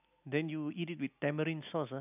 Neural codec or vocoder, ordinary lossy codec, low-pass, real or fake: none; none; 3.6 kHz; real